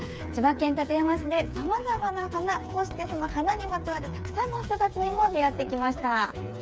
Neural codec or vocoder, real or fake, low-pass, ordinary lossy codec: codec, 16 kHz, 4 kbps, FreqCodec, smaller model; fake; none; none